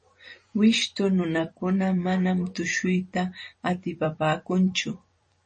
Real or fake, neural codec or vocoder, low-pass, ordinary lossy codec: fake; vocoder, 44.1 kHz, 128 mel bands every 256 samples, BigVGAN v2; 10.8 kHz; MP3, 32 kbps